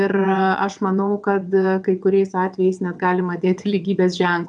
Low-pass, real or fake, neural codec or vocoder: 9.9 kHz; fake; vocoder, 22.05 kHz, 80 mel bands, WaveNeXt